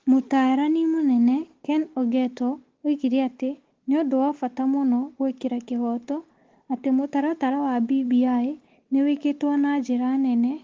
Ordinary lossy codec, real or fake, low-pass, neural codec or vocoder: Opus, 16 kbps; real; 7.2 kHz; none